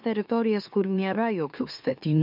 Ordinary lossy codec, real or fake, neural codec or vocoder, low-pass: MP3, 48 kbps; fake; autoencoder, 44.1 kHz, a latent of 192 numbers a frame, MeloTTS; 5.4 kHz